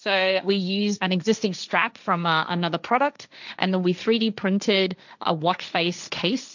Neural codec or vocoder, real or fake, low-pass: codec, 16 kHz, 1.1 kbps, Voila-Tokenizer; fake; 7.2 kHz